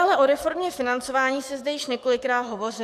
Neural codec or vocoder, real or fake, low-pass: codec, 44.1 kHz, 7.8 kbps, DAC; fake; 14.4 kHz